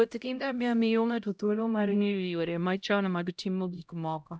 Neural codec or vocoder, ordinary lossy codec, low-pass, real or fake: codec, 16 kHz, 0.5 kbps, X-Codec, HuBERT features, trained on LibriSpeech; none; none; fake